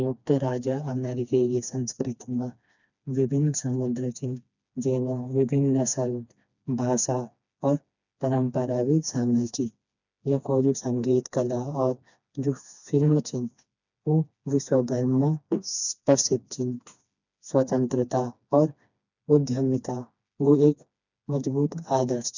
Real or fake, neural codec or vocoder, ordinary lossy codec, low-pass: fake; codec, 16 kHz, 2 kbps, FreqCodec, smaller model; none; 7.2 kHz